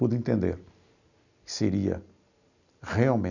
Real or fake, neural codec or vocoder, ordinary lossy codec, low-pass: real; none; none; 7.2 kHz